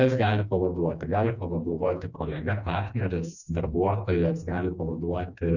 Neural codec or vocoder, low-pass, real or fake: codec, 16 kHz, 2 kbps, FreqCodec, smaller model; 7.2 kHz; fake